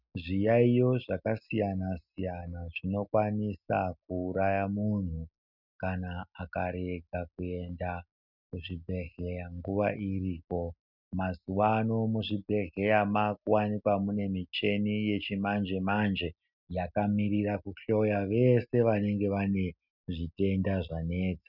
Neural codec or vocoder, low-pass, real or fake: none; 5.4 kHz; real